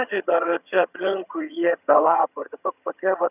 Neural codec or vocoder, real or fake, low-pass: vocoder, 22.05 kHz, 80 mel bands, HiFi-GAN; fake; 3.6 kHz